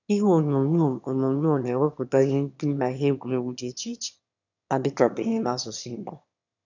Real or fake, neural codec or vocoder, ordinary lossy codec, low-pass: fake; autoencoder, 22.05 kHz, a latent of 192 numbers a frame, VITS, trained on one speaker; none; 7.2 kHz